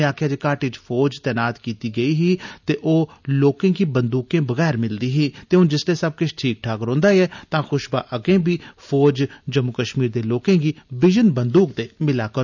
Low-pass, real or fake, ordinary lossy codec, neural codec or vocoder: 7.2 kHz; real; none; none